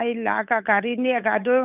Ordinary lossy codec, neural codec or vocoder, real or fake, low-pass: none; codec, 16 kHz, 8 kbps, FunCodec, trained on Chinese and English, 25 frames a second; fake; 3.6 kHz